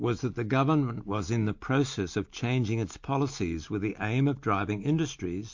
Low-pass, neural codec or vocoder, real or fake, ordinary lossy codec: 7.2 kHz; none; real; MP3, 48 kbps